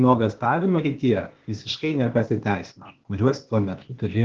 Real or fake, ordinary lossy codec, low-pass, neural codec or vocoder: fake; Opus, 16 kbps; 7.2 kHz; codec, 16 kHz, 0.8 kbps, ZipCodec